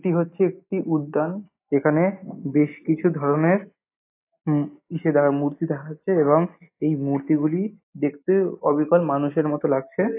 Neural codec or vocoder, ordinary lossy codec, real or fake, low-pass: none; AAC, 24 kbps; real; 3.6 kHz